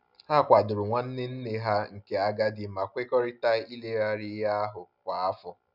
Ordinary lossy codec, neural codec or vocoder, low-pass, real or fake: none; none; 5.4 kHz; real